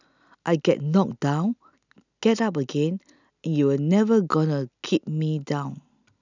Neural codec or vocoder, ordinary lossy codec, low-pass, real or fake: none; none; 7.2 kHz; real